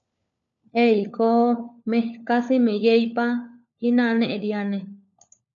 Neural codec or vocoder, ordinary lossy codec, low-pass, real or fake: codec, 16 kHz, 4 kbps, FunCodec, trained on LibriTTS, 50 frames a second; MP3, 64 kbps; 7.2 kHz; fake